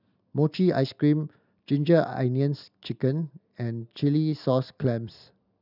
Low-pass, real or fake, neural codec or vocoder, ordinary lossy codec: 5.4 kHz; real; none; none